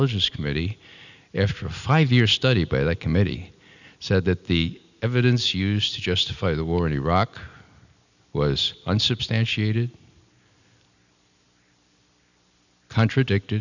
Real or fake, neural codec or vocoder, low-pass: real; none; 7.2 kHz